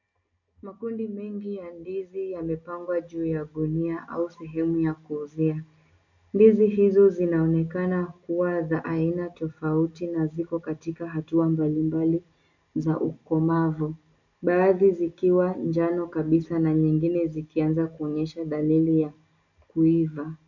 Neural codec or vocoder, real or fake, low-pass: none; real; 7.2 kHz